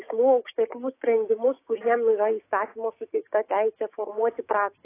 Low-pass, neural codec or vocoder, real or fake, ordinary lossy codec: 3.6 kHz; codec, 16 kHz, 6 kbps, DAC; fake; AAC, 24 kbps